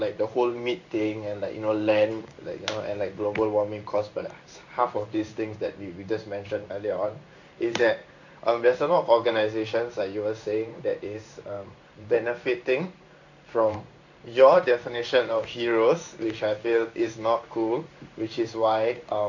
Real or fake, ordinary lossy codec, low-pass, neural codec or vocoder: fake; none; 7.2 kHz; codec, 16 kHz in and 24 kHz out, 1 kbps, XY-Tokenizer